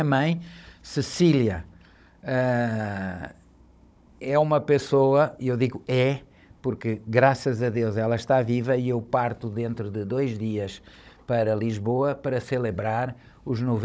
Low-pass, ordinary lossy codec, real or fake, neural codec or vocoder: none; none; fake; codec, 16 kHz, 16 kbps, FunCodec, trained on Chinese and English, 50 frames a second